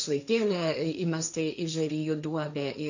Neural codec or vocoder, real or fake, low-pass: codec, 16 kHz, 1.1 kbps, Voila-Tokenizer; fake; 7.2 kHz